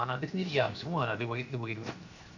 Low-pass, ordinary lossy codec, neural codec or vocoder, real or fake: 7.2 kHz; Opus, 64 kbps; codec, 16 kHz, 0.7 kbps, FocalCodec; fake